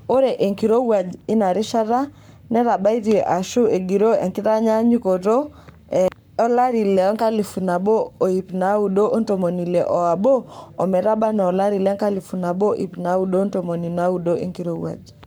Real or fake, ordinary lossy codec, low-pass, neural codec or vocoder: fake; none; none; codec, 44.1 kHz, 7.8 kbps, Pupu-Codec